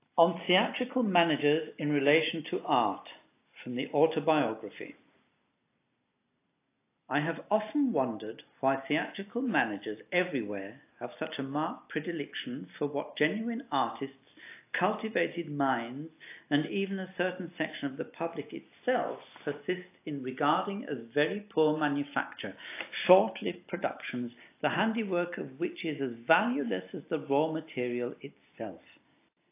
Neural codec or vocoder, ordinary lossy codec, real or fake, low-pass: none; AAC, 24 kbps; real; 3.6 kHz